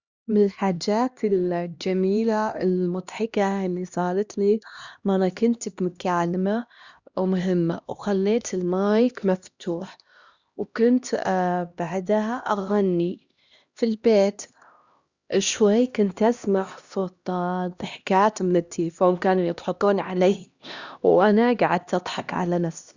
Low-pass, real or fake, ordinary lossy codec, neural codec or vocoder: 7.2 kHz; fake; Opus, 64 kbps; codec, 16 kHz, 1 kbps, X-Codec, HuBERT features, trained on LibriSpeech